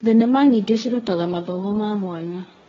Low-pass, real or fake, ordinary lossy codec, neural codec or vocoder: 7.2 kHz; fake; AAC, 24 kbps; codec, 16 kHz, 1.1 kbps, Voila-Tokenizer